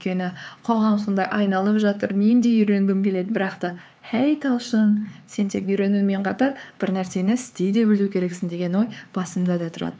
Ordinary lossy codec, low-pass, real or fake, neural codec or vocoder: none; none; fake; codec, 16 kHz, 4 kbps, X-Codec, HuBERT features, trained on LibriSpeech